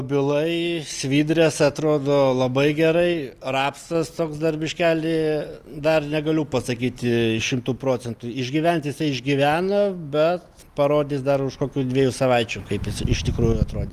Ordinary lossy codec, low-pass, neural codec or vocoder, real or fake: Opus, 32 kbps; 14.4 kHz; none; real